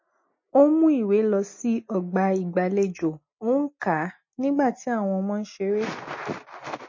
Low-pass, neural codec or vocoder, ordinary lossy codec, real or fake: 7.2 kHz; none; MP3, 32 kbps; real